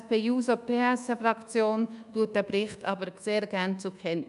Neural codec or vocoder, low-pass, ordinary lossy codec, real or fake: codec, 24 kHz, 1.2 kbps, DualCodec; 10.8 kHz; none; fake